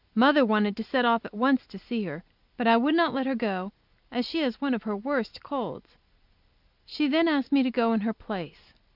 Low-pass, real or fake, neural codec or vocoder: 5.4 kHz; real; none